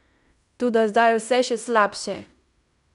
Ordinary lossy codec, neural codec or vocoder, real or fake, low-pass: none; codec, 16 kHz in and 24 kHz out, 0.9 kbps, LongCat-Audio-Codec, fine tuned four codebook decoder; fake; 10.8 kHz